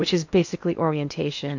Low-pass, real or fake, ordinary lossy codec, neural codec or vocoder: 7.2 kHz; fake; AAC, 48 kbps; codec, 16 kHz in and 24 kHz out, 0.8 kbps, FocalCodec, streaming, 65536 codes